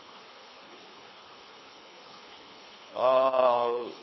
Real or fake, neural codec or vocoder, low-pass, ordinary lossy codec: fake; codec, 24 kHz, 3 kbps, HILCodec; 7.2 kHz; MP3, 24 kbps